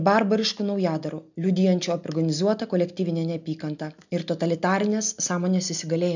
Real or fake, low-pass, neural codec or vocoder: real; 7.2 kHz; none